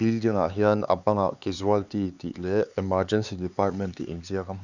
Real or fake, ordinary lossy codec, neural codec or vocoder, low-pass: fake; none; codec, 16 kHz, 4 kbps, X-Codec, HuBERT features, trained on LibriSpeech; 7.2 kHz